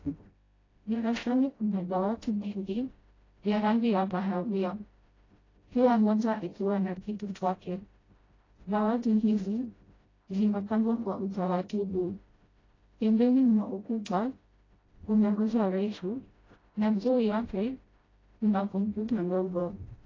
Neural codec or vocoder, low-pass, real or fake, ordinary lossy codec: codec, 16 kHz, 0.5 kbps, FreqCodec, smaller model; 7.2 kHz; fake; AAC, 32 kbps